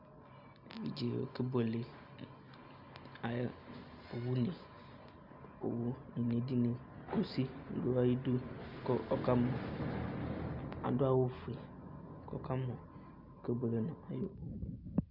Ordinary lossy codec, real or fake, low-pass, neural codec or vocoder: Opus, 64 kbps; real; 5.4 kHz; none